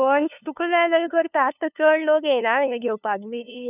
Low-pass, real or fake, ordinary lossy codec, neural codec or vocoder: 3.6 kHz; fake; none; codec, 16 kHz, 2 kbps, FunCodec, trained on LibriTTS, 25 frames a second